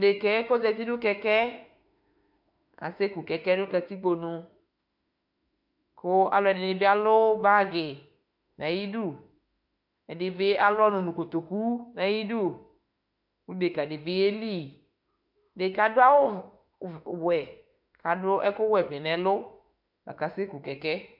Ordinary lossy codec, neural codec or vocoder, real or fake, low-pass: MP3, 48 kbps; autoencoder, 48 kHz, 32 numbers a frame, DAC-VAE, trained on Japanese speech; fake; 5.4 kHz